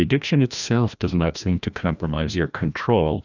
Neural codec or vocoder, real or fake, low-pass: codec, 16 kHz, 1 kbps, FreqCodec, larger model; fake; 7.2 kHz